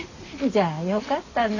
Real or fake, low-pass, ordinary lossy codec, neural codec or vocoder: real; 7.2 kHz; none; none